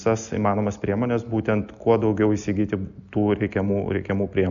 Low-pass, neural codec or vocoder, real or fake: 7.2 kHz; none; real